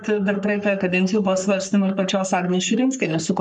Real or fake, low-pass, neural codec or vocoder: fake; 10.8 kHz; codec, 44.1 kHz, 3.4 kbps, Pupu-Codec